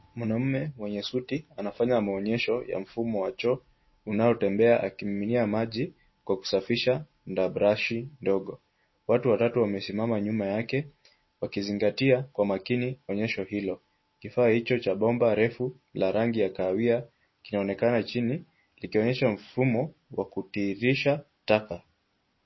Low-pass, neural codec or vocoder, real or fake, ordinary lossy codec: 7.2 kHz; none; real; MP3, 24 kbps